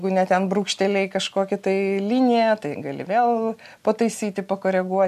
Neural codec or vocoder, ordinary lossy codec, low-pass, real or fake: none; AAC, 96 kbps; 14.4 kHz; real